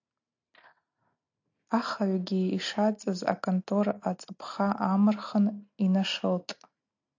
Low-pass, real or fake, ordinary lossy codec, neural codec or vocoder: 7.2 kHz; real; AAC, 48 kbps; none